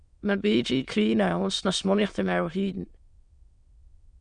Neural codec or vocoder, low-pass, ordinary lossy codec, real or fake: autoencoder, 22.05 kHz, a latent of 192 numbers a frame, VITS, trained on many speakers; 9.9 kHz; Opus, 64 kbps; fake